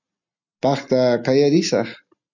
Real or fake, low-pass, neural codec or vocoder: real; 7.2 kHz; none